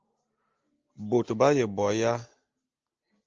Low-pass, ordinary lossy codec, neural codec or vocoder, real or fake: 7.2 kHz; Opus, 24 kbps; none; real